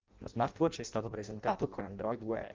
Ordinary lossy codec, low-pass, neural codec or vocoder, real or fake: Opus, 24 kbps; 7.2 kHz; codec, 16 kHz in and 24 kHz out, 0.6 kbps, FireRedTTS-2 codec; fake